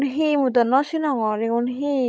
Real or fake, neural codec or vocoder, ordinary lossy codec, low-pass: fake; codec, 16 kHz, 16 kbps, FreqCodec, larger model; none; none